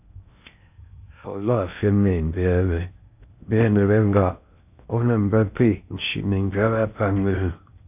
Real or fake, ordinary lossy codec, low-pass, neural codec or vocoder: fake; AAC, 32 kbps; 3.6 kHz; codec, 16 kHz in and 24 kHz out, 0.6 kbps, FocalCodec, streaming, 4096 codes